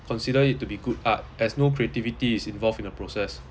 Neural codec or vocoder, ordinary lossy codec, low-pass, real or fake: none; none; none; real